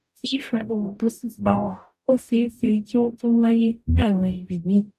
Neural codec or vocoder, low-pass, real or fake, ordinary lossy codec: codec, 44.1 kHz, 0.9 kbps, DAC; 14.4 kHz; fake; none